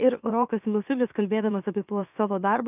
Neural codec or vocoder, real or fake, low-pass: autoencoder, 44.1 kHz, a latent of 192 numbers a frame, MeloTTS; fake; 3.6 kHz